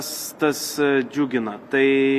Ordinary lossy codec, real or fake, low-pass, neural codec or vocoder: Opus, 64 kbps; real; 14.4 kHz; none